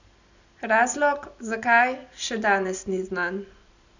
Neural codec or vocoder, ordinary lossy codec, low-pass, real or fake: none; none; 7.2 kHz; real